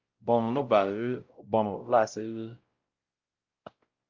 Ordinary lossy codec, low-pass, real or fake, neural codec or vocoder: Opus, 24 kbps; 7.2 kHz; fake; codec, 16 kHz, 0.5 kbps, X-Codec, WavLM features, trained on Multilingual LibriSpeech